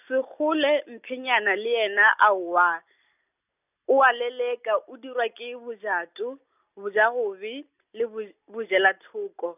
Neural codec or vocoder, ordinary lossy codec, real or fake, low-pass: none; none; real; 3.6 kHz